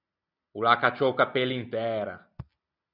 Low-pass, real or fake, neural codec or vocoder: 5.4 kHz; real; none